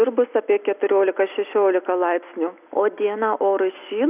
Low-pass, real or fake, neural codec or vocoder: 3.6 kHz; real; none